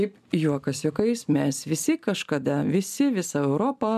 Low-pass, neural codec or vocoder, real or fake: 14.4 kHz; none; real